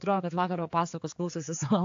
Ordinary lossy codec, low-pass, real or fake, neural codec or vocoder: AAC, 48 kbps; 7.2 kHz; fake; codec, 16 kHz, 4 kbps, X-Codec, HuBERT features, trained on general audio